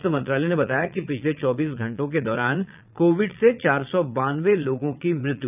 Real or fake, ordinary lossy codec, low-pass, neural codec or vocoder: fake; none; 3.6 kHz; vocoder, 22.05 kHz, 80 mel bands, Vocos